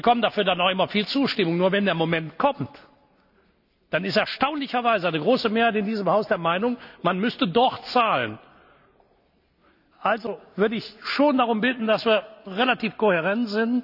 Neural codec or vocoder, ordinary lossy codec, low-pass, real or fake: none; none; 5.4 kHz; real